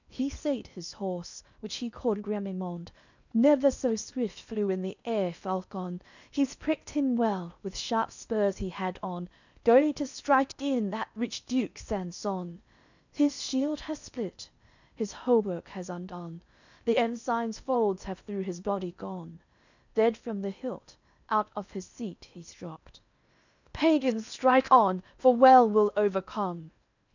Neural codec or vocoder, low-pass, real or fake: codec, 16 kHz in and 24 kHz out, 0.8 kbps, FocalCodec, streaming, 65536 codes; 7.2 kHz; fake